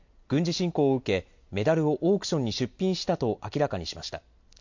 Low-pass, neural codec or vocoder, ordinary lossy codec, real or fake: 7.2 kHz; none; MP3, 48 kbps; real